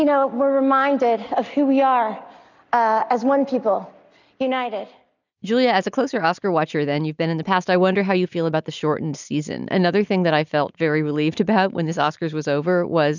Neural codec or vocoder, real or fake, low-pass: none; real; 7.2 kHz